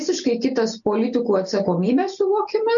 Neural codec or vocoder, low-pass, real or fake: none; 7.2 kHz; real